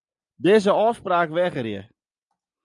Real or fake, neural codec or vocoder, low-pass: real; none; 10.8 kHz